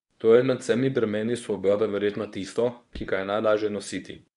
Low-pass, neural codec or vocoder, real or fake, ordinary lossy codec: 10.8 kHz; codec, 24 kHz, 0.9 kbps, WavTokenizer, medium speech release version 1; fake; MP3, 96 kbps